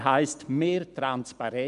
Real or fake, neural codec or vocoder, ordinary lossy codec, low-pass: real; none; none; 10.8 kHz